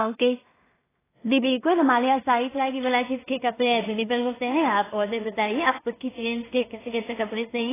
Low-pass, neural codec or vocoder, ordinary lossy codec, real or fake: 3.6 kHz; codec, 16 kHz in and 24 kHz out, 0.4 kbps, LongCat-Audio-Codec, two codebook decoder; AAC, 16 kbps; fake